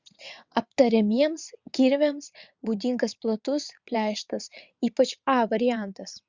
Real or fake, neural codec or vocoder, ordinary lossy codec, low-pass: fake; vocoder, 22.05 kHz, 80 mel bands, WaveNeXt; Opus, 64 kbps; 7.2 kHz